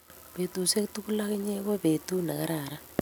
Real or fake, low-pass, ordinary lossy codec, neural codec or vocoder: real; none; none; none